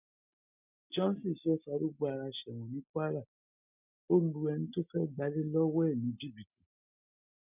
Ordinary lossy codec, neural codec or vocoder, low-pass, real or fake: none; none; 3.6 kHz; real